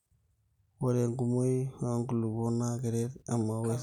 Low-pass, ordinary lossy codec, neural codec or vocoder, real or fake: 19.8 kHz; none; none; real